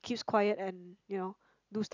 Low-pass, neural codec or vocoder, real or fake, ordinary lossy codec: 7.2 kHz; none; real; none